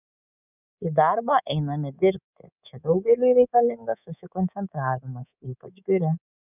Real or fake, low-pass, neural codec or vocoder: fake; 3.6 kHz; codec, 24 kHz, 3.1 kbps, DualCodec